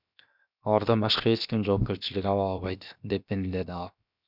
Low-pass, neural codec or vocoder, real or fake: 5.4 kHz; codec, 16 kHz, 0.7 kbps, FocalCodec; fake